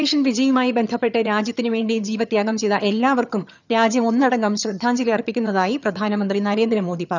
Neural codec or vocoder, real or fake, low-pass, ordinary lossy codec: vocoder, 22.05 kHz, 80 mel bands, HiFi-GAN; fake; 7.2 kHz; none